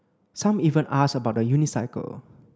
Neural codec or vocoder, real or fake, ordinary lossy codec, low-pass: none; real; none; none